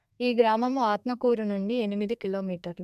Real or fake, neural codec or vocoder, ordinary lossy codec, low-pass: fake; codec, 32 kHz, 1.9 kbps, SNAC; none; 14.4 kHz